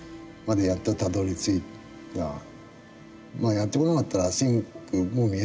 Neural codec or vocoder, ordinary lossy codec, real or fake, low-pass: none; none; real; none